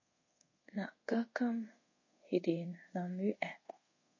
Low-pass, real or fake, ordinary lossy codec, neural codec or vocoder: 7.2 kHz; fake; MP3, 32 kbps; codec, 24 kHz, 0.5 kbps, DualCodec